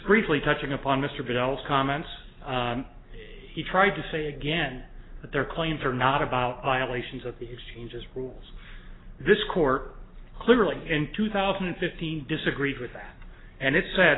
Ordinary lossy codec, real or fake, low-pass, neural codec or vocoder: AAC, 16 kbps; real; 7.2 kHz; none